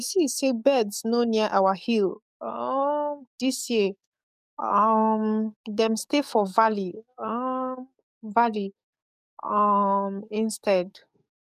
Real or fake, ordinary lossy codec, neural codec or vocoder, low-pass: fake; none; codec, 44.1 kHz, 7.8 kbps, DAC; 14.4 kHz